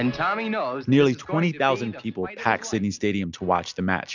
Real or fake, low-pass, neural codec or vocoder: real; 7.2 kHz; none